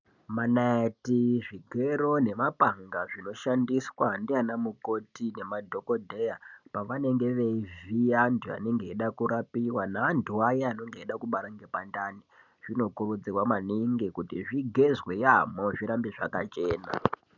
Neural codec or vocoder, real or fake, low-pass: none; real; 7.2 kHz